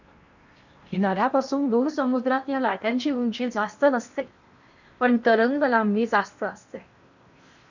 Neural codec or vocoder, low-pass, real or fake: codec, 16 kHz in and 24 kHz out, 0.8 kbps, FocalCodec, streaming, 65536 codes; 7.2 kHz; fake